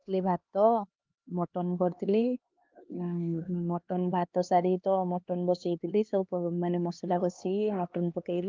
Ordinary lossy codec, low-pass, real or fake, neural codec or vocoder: Opus, 32 kbps; 7.2 kHz; fake; codec, 16 kHz, 2 kbps, X-Codec, HuBERT features, trained on LibriSpeech